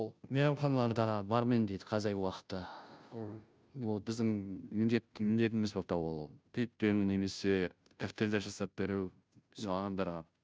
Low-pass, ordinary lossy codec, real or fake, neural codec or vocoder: none; none; fake; codec, 16 kHz, 0.5 kbps, FunCodec, trained on Chinese and English, 25 frames a second